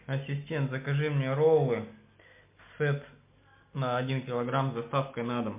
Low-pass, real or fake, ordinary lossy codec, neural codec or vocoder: 3.6 kHz; real; MP3, 32 kbps; none